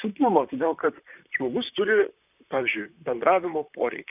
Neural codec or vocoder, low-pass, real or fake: vocoder, 44.1 kHz, 128 mel bands, Pupu-Vocoder; 3.6 kHz; fake